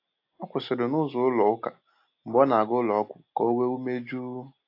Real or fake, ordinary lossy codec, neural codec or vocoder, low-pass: real; AAC, 32 kbps; none; 5.4 kHz